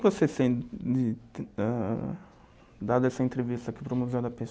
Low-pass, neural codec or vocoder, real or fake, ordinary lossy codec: none; none; real; none